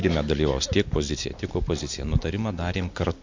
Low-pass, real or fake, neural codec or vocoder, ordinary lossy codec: 7.2 kHz; real; none; MP3, 48 kbps